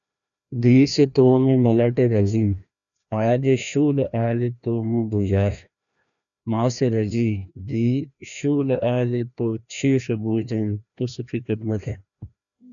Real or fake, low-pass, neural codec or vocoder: fake; 7.2 kHz; codec, 16 kHz, 1 kbps, FreqCodec, larger model